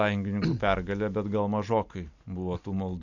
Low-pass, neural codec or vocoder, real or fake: 7.2 kHz; none; real